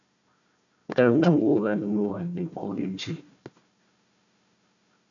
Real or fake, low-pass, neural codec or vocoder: fake; 7.2 kHz; codec, 16 kHz, 1 kbps, FunCodec, trained on Chinese and English, 50 frames a second